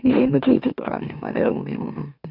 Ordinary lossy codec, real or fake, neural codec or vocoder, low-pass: none; fake; autoencoder, 44.1 kHz, a latent of 192 numbers a frame, MeloTTS; 5.4 kHz